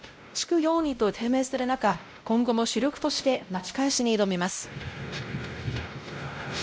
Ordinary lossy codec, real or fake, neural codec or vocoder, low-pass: none; fake; codec, 16 kHz, 0.5 kbps, X-Codec, WavLM features, trained on Multilingual LibriSpeech; none